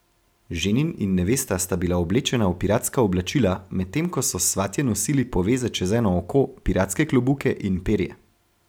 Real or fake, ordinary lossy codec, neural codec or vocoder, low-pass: real; none; none; none